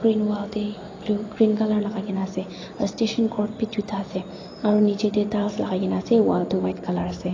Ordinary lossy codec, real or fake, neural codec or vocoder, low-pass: AAC, 32 kbps; real; none; 7.2 kHz